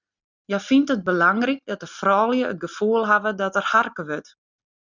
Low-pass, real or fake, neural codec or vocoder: 7.2 kHz; real; none